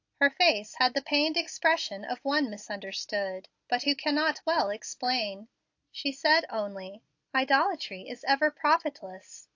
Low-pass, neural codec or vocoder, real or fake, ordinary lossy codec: 7.2 kHz; none; real; AAC, 48 kbps